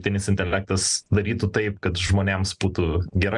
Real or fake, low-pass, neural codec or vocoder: real; 10.8 kHz; none